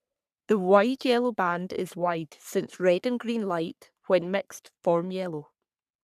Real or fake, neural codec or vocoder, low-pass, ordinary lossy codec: fake; codec, 44.1 kHz, 3.4 kbps, Pupu-Codec; 14.4 kHz; none